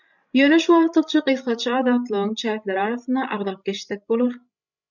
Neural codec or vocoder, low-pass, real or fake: codec, 16 kHz, 16 kbps, FreqCodec, larger model; 7.2 kHz; fake